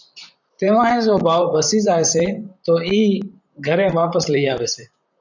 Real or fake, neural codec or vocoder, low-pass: fake; vocoder, 44.1 kHz, 128 mel bands, Pupu-Vocoder; 7.2 kHz